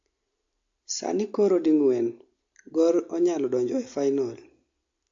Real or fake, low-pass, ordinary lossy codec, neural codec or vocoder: real; 7.2 kHz; AAC, 48 kbps; none